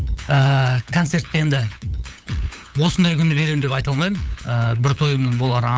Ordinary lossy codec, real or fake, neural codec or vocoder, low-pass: none; fake; codec, 16 kHz, 8 kbps, FunCodec, trained on LibriTTS, 25 frames a second; none